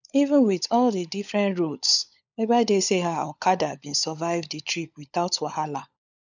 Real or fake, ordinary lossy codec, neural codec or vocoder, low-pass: fake; none; codec, 16 kHz, 16 kbps, FunCodec, trained on LibriTTS, 50 frames a second; 7.2 kHz